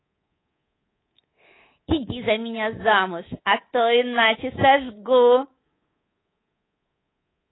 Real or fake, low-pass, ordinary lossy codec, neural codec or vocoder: fake; 7.2 kHz; AAC, 16 kbps; codec, 24 kHz, 3.1 kbps, DualCodec